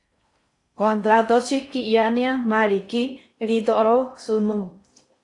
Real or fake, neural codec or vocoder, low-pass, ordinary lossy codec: fake; codec, 16 kHz in and 24 kHz out, 0.6 kbps, FocalCodec, streaming, 4096 codes; 10.8 kHz; AAC, 48 kbps